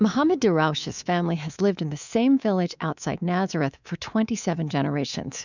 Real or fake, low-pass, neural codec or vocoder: fake; 7.2 kHz; codec, 24 kHz, 3.1 kbps, DualCodec